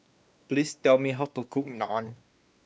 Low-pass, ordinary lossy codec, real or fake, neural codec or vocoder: none; none; fake; codec, 16 kHz, 2 kbps, X-Codec, WavLM features, trained on Multilingual LibriSpeech